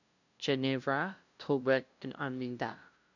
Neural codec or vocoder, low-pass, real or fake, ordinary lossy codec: codec, 16 kHz, 0.5 kbps, FunCodec, trained on LibriTTS, 25 frames a second; 7.2 kHz; fake; none